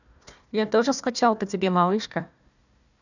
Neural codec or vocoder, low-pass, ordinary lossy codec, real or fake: codec, 16 kHz, 1 kbps, FunCodec, trained on Chinese and English, 50 frames a second; 7.2 kHz; none; fake